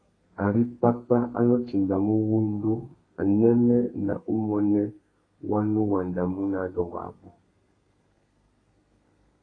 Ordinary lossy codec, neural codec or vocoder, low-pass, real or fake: AAC, 32 kbps; codec, 32 kHz, 1.9 kbps, SNAC; 9.9 kHz; fake